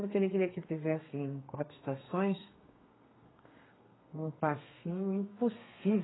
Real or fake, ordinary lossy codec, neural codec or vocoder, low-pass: fake; AAC, 16 kbps; codec, 32 kHz, 1.9 kbps, SNAC; 7.2 kHz